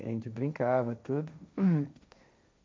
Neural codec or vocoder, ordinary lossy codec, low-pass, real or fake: codec, 16 kHz, 1.1 kbps, Voila-Tokenizer; none; none; fake